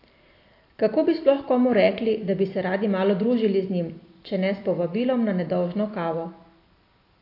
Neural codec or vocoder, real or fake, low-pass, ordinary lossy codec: none; real; 5.4 kHz; AAC, 32 kbps